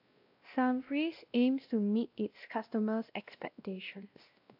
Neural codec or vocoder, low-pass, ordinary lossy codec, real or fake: codec, 16 kHz, 1 kbps, X-Codec, WavLM features, trained on Multilingual LibriSpeech; 5.4 kHz; AAC, 48 kbps; fake